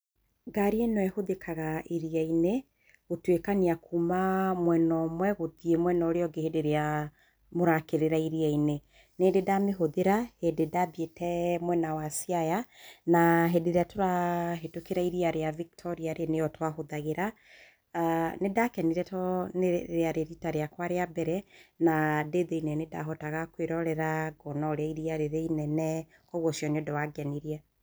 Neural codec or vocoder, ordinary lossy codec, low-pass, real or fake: none; none; none; real